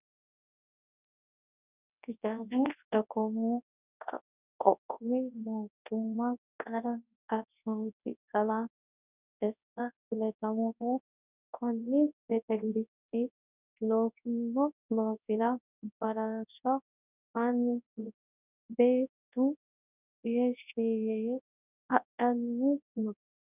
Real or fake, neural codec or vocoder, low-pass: fake; codec, 24 kHz, 0.9 kbps, WavTokenizer, large speech release; 3.6 kHz